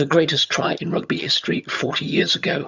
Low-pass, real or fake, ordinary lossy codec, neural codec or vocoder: 7.2 kHz; fake; Opus, 64 kbps; vocoder, 22.05 kHz, 80 mel bands, HiFi-GAN